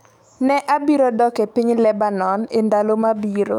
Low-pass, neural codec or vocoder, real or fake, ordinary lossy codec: 19.8 kHz; autoencoder, 48 kHz, 128 numbers a frame, DAC-VAE, trained on Japanese speech; fake; none